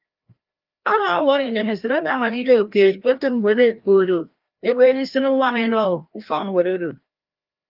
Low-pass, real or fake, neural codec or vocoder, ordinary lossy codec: 5.4 kHz; fake; codec, 16 kHz, 1 kbps, FreqCodec, larger model; Opus, 24 kbps